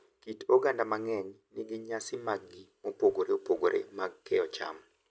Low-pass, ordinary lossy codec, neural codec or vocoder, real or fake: none; none; none; real